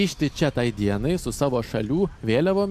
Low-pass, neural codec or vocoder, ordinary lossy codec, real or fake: 14.4 kHz; none; AAC, 96 kbps; real